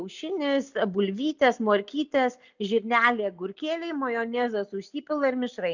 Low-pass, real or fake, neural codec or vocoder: 7.2 kHz; real; none